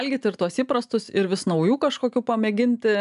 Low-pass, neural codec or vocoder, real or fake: 10.8 kHz; none; real